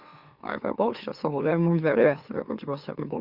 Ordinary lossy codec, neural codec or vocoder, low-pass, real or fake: Opus, 64 kbps; autoencoder, 44.1 kHz, a latent of 192 numbers a frame, MeloTTS; 5.4 kHz; fake